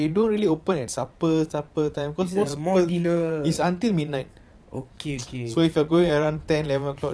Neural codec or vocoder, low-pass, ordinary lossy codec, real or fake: vocoder, 22.05 kHz, 80 mel bands, Vocos; none; none; fake